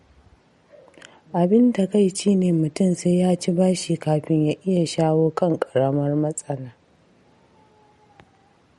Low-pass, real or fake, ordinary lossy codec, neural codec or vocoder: 19.8 kHz; real; MP3, 48 kbps; none